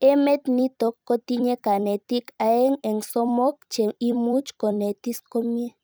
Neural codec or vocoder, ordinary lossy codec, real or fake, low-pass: vocoder, 44.1 kHz, 128 mel bands every 256 samples, BigVGAN v2; none; fake; none